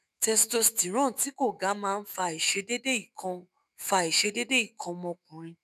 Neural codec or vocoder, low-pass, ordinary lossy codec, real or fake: autoencoder, 48 kHz, 128 numbers a frame, DAC-VAE, trained on Japanese speech; 14.4 kHz; none; fake